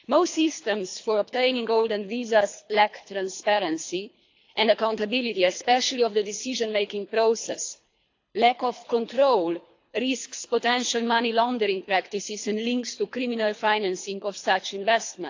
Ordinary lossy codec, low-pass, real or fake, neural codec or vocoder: AAC, 48 kbps; 7.2 kHz; fake; codec, 24 kHz, 3 kbps, HILCodec